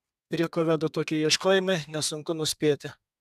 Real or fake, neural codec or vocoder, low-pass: fake; codec, 32 kHz, 1.9 kbps, SNAC; 14.4 kHz